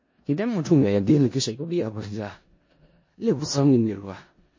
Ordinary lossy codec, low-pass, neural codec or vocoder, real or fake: MP3, 32 kbps; 7.2 kHz; codec, 16 kHz in and 24 kHz out, 0.4 kbps, LongCat-Audio-Codec, four codebook decoder; fake